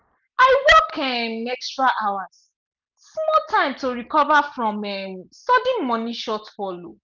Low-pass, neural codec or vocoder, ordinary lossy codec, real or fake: 7.2 kHz; none; none; real